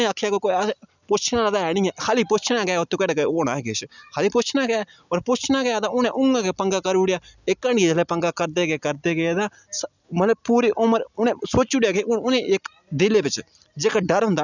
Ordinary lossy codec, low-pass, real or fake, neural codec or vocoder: none; 7.2 kHz; real; none